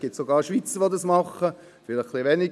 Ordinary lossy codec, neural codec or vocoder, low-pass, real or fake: none; none; none; real